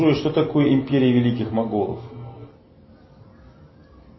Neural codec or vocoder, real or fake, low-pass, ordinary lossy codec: none; real; 7.2 kHz; MP3, 24 kbps